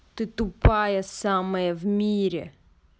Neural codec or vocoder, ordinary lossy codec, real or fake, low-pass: none; none; real; none